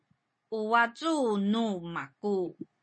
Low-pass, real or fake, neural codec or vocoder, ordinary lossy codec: 9.9 kHz; real; none; MP3, 32 kbps